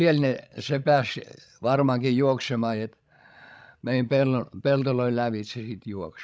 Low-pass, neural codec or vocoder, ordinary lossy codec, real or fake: none; codec, 16 kHz, 16 kbps, FreqCodec, larger model; none; fake